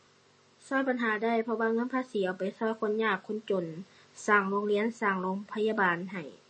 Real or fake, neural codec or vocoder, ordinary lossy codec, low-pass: real; none; MP3, 32 kbps; 10.8 kHz